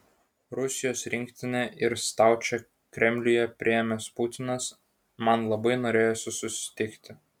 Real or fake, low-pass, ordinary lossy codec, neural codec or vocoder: real; 19.8 kHz; MP3, 96 kbps; none